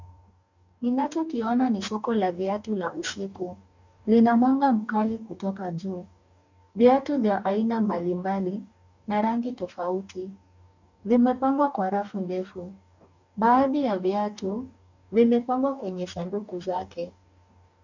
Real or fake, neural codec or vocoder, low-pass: fake; codec, 44.1 kHz, 2.6 kbps, DAC; 7.2 kHz